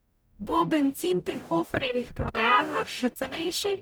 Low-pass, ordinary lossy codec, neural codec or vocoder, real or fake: none; none; codec, 44.1 kHz, 0.9 kbps, DAC; fake